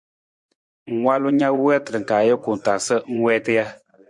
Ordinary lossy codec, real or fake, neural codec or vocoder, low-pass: MP3, 64 kbps; fake; vocoder, 24 kHz, 100 mel bands, Vocos; 10.8 kHz